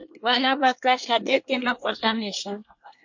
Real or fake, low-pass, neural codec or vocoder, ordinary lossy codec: fake; 7.2 kHz; codec, 24 kHz, 1 kbps, SNAC; MP3, 48 kbps